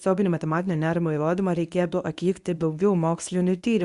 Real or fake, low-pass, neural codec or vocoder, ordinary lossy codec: fake; 10.8 kHz; codec, 24 kHz, 0.9 kbps, WavTokenizer, medium speech release version 1; Opus, 64 kbps